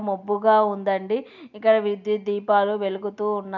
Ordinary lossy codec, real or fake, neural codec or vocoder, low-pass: none; real; none; 7.2 kHz